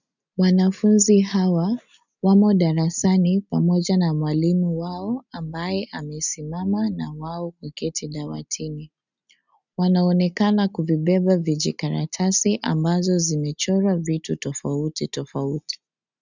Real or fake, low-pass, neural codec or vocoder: real; 7.2 kHz; none